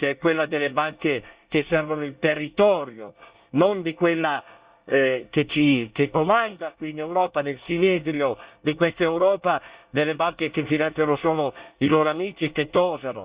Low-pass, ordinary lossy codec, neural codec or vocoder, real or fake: 3.6 kHz; Opus, 64 kbps; codec, 24 kHz, 1 kbps, SNAC; fake